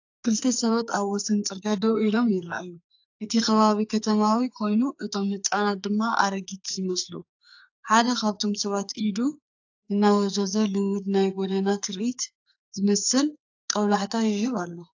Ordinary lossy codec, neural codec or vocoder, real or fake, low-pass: AAC, 48 kbps; codec, 44.1 kHz, 2.6 kbps, SNAC; fake; 7.2 kHz